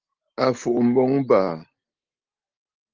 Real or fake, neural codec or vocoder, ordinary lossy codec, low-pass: real; none; Opus, 24 kbps; 7.2 kHz